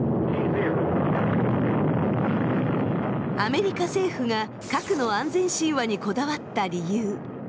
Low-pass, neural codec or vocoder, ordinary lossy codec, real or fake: none; none; none; real